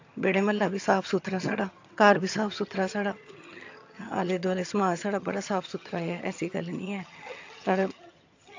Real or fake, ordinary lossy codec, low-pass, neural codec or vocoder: fake; AAC, 48 kbps; 7.2 kHz; vocoder, 22.05 kHz, 80 mel bands, HiFi-GAN